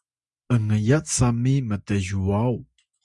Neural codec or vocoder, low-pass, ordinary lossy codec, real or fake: none; 10.8 kHz; Opus, 64 kbps; real